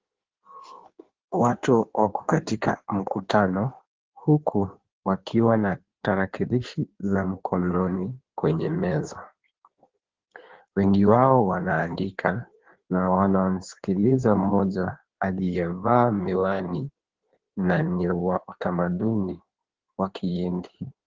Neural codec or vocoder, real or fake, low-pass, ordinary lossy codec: codec, 16 kHz in and 24 kHz out, 1.1 kbps, FireRedTTS-2 codec; fake; 7.2 kHz; Opus, 16 kbps